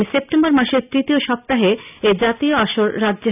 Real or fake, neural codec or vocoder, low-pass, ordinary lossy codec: real; none; 3.6 kHz; none